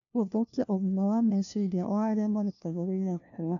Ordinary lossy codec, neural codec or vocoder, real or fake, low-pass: none; codec, 16 kHz, 1 kbps, FunCodec, trained on LibriTTS, 50 frames a second; fake; 7.2 kHz